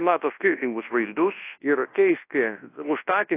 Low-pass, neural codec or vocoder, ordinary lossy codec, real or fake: 3.6 kHz; codec, 24 kHz, 0.9 kbps, WavTokenizer, large speech release; AAC, 24 kbps; fake